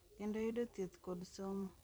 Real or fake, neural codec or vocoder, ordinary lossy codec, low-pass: fake; vocoder, 44.1 kHz, 128 mel bands every 256 samples, BigVGAN v2; none; none